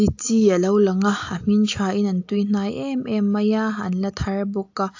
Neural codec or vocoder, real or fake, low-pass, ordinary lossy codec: none; real; 7.2 kHz; AAC, 48 kbps